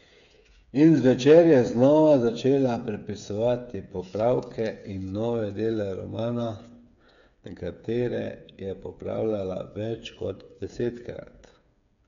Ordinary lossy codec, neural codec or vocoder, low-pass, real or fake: none; codec, 16 kHz, 8 kbps, FreqCodec, smaller model; 7.2 kHz; fake